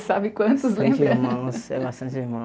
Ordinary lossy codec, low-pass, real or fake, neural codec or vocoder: none; none; real; none